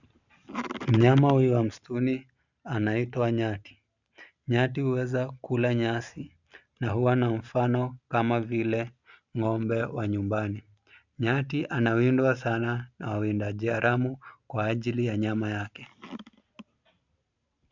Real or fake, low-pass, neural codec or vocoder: real; 7.2 kHz; none